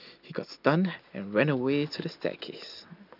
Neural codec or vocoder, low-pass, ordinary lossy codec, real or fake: none; 5.4 kHz; none; real